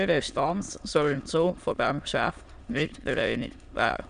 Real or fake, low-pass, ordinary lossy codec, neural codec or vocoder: fake; 9.9 kHz; none; autoencoder, 22.05 kHz, a latent of 192 numbers a frame, VITS, trained on many speakers